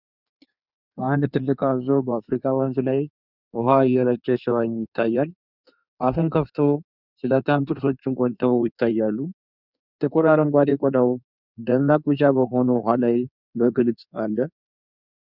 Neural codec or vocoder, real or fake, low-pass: codec, 16 kHz in and 24 kHz out, 1.1 kbps, FireRedTTS-2 codec; fake; 5.4 kHz